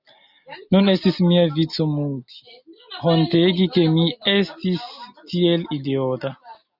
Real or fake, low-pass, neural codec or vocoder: real; 5.4 kHz; none